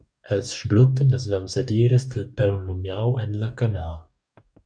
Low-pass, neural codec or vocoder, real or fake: 9.9 kHz; codec, 44.1 kHz, 2.6 kbps, DAC; fake